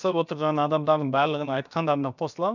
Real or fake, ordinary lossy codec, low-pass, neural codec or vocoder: fake; none; 7.2 kHz; codec, 16 kHz, about 1 kbps, DyCAST, with the encoder's durations